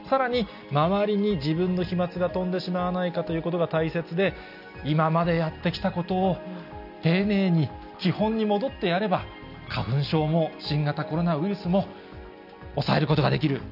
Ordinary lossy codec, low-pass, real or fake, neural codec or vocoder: none; 5.4 kHz; real; none